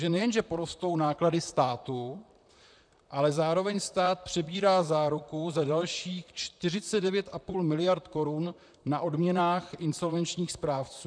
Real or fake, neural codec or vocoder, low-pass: fake; vocoder, 44.1 kHz, 128 mel bands, Pupu-Vocoder; 9.9 kHz